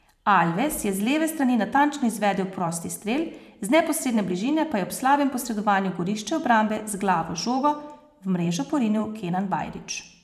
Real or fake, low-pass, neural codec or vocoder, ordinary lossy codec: fake; 14.4 kHz; vocoder, 44.1 kHz, 128 mel bands every 512 samples, BigVGAN v2; none